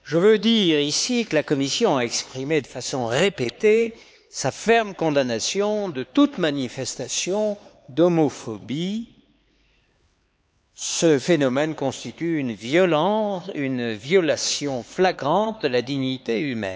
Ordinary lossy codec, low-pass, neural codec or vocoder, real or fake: none; none; codec, 16 kHz, 4 kbps, X-Codec, HuBERT features, trained on LibriSpeech; fake